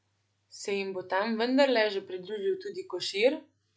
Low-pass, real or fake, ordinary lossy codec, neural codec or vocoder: none; real; none; none